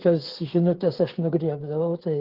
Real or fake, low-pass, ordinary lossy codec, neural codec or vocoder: fake; 5.4 kHz; Opus, 16 kbps; codec, 16 kHz, 8 kbps, FreqCodec, smaller model